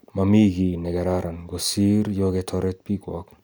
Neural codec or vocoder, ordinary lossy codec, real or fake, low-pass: none; none; real; none